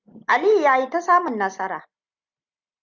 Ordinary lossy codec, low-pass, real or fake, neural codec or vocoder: Opus, 64 kbps; 7.2 kHz; real; none